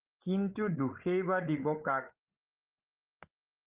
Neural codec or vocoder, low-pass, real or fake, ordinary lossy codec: codec, 16 kHz, 8 kbps, FreqCodec, larger model; 3.6 kHz; fake; Opus, 32 kbps